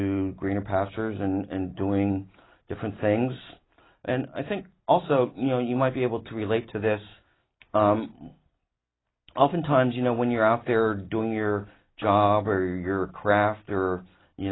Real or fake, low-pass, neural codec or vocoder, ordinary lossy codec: real; 7.2 kHz; none; AAC, 16 kbps